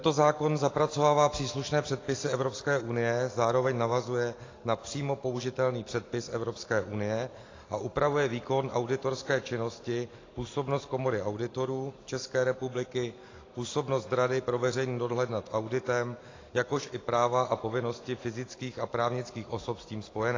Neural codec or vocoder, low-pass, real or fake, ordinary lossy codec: none; 7.2 kHz; real; AAC, 32 kbps